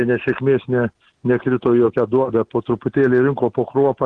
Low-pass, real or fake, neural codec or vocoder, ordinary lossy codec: 9.9 kHz; real; none; Opus, 16 kbps